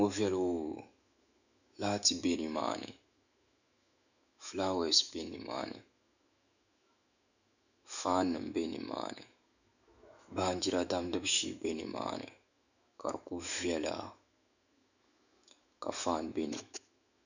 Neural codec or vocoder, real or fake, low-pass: autoencoder, 48 kHz, 128 numbers a frame, DAC-VAE, trained on Japanese speech; fake; 7.2 kHz